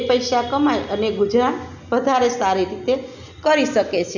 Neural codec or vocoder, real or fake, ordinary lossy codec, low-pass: none; real; none; 7.2 kHz